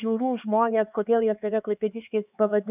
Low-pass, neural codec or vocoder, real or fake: 3.6 kHz; codec, 16 kHz, 4 kbps, X-Codec, HuBERT features, trained on LibriSpeech; fake